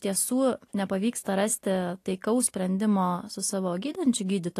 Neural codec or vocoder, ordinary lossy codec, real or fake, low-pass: none; AAC, 48 kbps; real; 14.4 kHz